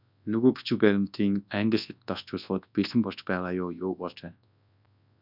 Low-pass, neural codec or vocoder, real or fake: 5.4 kHz; codec, 24 kHz, 0.9 kbps, WavTokenizer, large speech release; fake